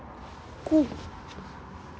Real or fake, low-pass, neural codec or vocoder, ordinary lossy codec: real; none; none; none